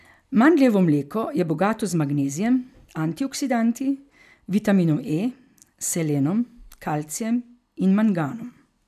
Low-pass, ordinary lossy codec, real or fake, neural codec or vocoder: 14.4 kHz; none; real; none